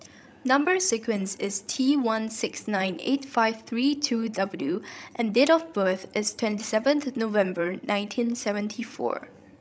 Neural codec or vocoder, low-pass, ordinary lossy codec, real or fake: codec, 16 kHz, 16 kbps, FreqCodec, larger model; none; none; fake